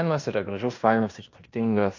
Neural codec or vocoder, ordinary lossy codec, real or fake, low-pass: codec, 16 kHz in and 24 kHz out, 0.9 kbps, LongCat-Audio-Codec, fine tuned four codebook decoder; AAC, 48 kbps; fake; 7.2 kHz